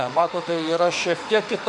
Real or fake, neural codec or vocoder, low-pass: fake; autoencoder, 48 kHz, 32 numbers a frame, DAC-VAE, trained on Japanese speech; 10.8 kHz